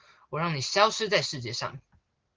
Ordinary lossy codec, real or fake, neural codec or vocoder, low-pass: Opus, 16 kbps; real; none; 7.2 kHz